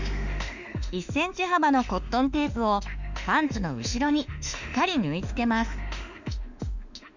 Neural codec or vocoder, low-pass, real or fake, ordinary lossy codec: autoencoder, 48 kHz, 32 numbers a frame, DAC-VAE, trained on Japanese speech; 7.2 kHz; fake; none